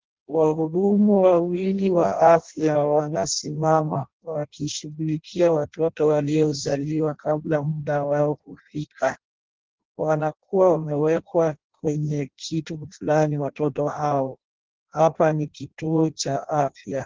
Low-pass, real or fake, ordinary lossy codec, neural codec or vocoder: 7.2 kHz; fake; Opus, 16 kbps; codec, 16 kHz in and 24 kHz out, 0.6 kbps, FireRedTTS-2 codec